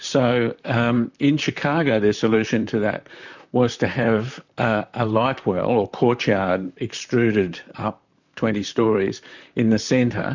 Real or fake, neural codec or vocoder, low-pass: fake; vocoder, 44.1 kHz, 128 mel bands, Pupu-Vocoder; 7.2 kHz